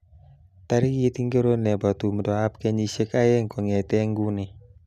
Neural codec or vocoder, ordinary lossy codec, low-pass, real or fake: none; none; 14.4 kHz; real